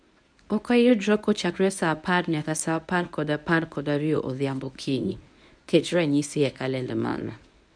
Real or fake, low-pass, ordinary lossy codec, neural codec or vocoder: fake; 9.9 kHz; none; codec, 24 kHz, 0.9 kbps, WavTokenizer, medium speech release version 1